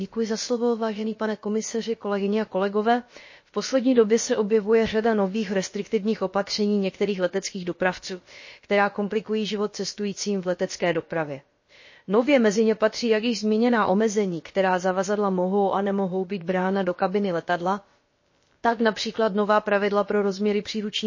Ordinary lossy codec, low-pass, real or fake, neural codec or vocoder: MP3, 32 kbps; 7.2 kHz; fake; codec, 16 kHz, about 1 kbps, DyCAST, with the encoder's durations